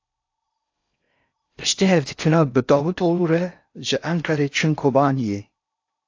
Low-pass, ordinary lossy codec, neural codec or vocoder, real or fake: 7.2 kHz; AAC, 48 kbps; codec, 16 kHz in and 24 kHz out, 0.6 kbps, FocalCodec, streaming, 4096 codes; fake